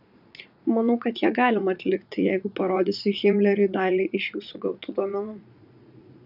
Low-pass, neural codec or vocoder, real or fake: 5.4 kHz; vocoder, 44.1 kHz, 80 mel bands, Vocos; fake